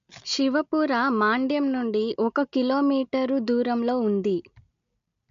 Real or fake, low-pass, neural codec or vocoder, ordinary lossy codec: real; 7.2 kHz; none; MP3, 48 kbps